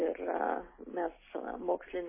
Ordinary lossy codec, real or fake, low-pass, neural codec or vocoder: MP3, 16 kbps; real; 3.6 kHz; none